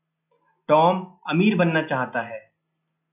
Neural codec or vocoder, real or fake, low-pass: none; real; 3.6 kHz